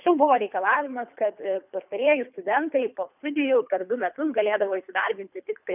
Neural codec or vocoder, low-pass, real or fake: codec, 24 kHz, 3 kbps, HILCodec; 3.6 kHz; fake